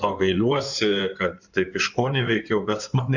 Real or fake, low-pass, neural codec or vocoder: fake; 7.2 kHz; codec, 16 kHz in and 24 kHz out, 2.2 kbps, FireRedTTS-2 codec